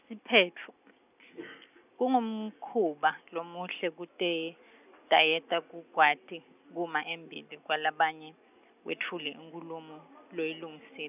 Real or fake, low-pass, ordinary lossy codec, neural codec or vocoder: real; 3.6 kHz; none; none